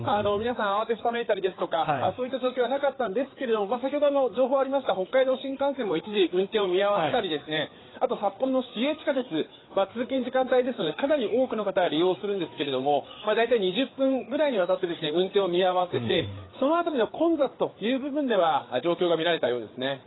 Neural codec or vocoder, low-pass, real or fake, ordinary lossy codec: codec, 16 kHz, 4 kbps, FreqCodec, larger model; 7.2 kHz; fake; AAC, 16 kbps